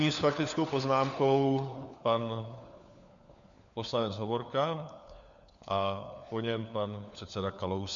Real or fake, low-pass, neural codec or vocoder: fake; 7.2 kHz; codec, 16 kHz, 4 kbps, FunCodec, trained on LibriTTS, 50 frames a second